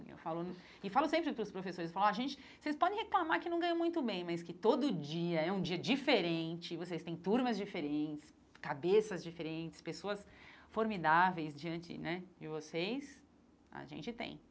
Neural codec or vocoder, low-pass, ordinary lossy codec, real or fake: none; none; none; real